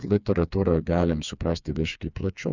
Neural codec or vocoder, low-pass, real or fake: codec, 16 kHz, 4 kbps, FreqCodec, smaller model; 7.2 kHz; fake